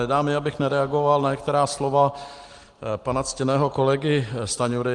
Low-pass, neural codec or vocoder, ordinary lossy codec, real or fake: 10.8 kHz; none; Opus, 24 kbps; real